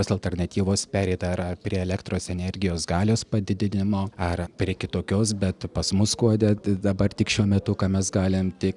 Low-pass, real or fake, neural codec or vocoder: 10.8 kHz; real; none